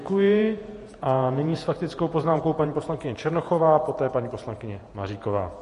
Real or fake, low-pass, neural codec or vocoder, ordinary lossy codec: fake; 14.4 kHz; vocoder, 48 kHz, 128 mel bands, Vocos; MP3, 48 kbps